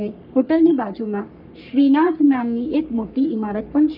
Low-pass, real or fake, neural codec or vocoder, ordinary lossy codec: 5.4 kHz; fake; codec, 44.1 kHz, 3.4 kbps, Pupu-Codec; none